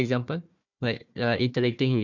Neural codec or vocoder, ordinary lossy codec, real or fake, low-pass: codec, 16 kHz, 1 kbps, FunCodec, trained on Chinese and English, 50 frames a second; none; fake; 7.2 kHz